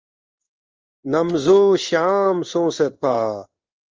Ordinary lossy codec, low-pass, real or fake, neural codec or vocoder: Opus, 24 kbps; 7.2 kHz; fake; codec, 16 kHz in and 24 kHz out, 1 kbps, XY-Tokenizer